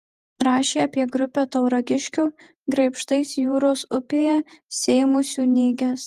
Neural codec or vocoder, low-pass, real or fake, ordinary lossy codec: vocoder, 48 kHz, 128 mel bands, Vocos; 14.4 kHz; fake; Opus, 24 kbps